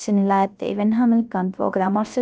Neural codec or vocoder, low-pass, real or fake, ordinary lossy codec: codec, 16 kHz, 0.3 kbps, FocalCodec; none; fake; none